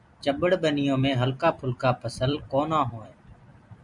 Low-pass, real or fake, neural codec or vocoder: 10.8 kHz; fake; vocoder, 44.1 kHz, 128 mel bands every 256 samples, BigVGAN v2